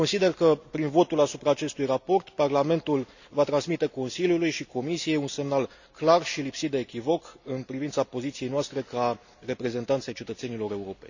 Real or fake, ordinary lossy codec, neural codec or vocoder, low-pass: real; none; none; 7.2 kHz